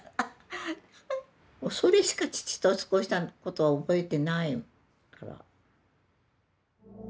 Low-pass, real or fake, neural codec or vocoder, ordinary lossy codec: none; real; none; none